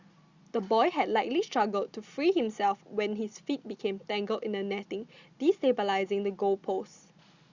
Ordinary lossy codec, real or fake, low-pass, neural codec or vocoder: Opus, 64 kbps; real; 7.2 kHz; none